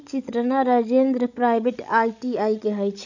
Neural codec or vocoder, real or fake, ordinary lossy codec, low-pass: codec, 16 kHz, 16 kbps, FreqCodec, larger model; fake; none; 7.2 kHz